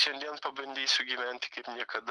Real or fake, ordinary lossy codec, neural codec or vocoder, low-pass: real; Opus, 32 kbps; none; 10.8 kHz